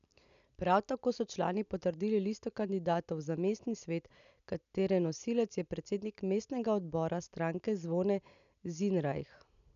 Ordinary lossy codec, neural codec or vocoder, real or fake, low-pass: none; none; real; 7.2 kHz